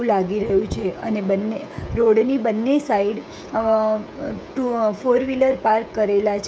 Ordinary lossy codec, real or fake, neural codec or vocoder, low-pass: none; fake; codec, 16 kHz, 16 kbps, FreqCodec, smaller model; none